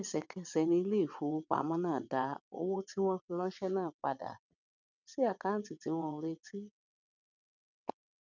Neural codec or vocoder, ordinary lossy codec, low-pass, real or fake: vocoder, 22.05 kHz, 80 mel bands, WaveNeXt; none; 7.2 kHz; fake